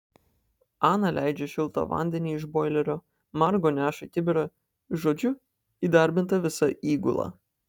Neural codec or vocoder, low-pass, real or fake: none; 19.8 kHz; real